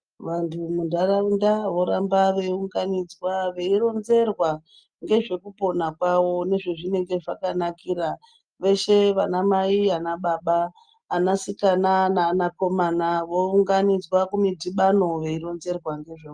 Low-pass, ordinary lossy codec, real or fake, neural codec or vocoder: 9.9 kHz; Opus, 32 kbps; real; none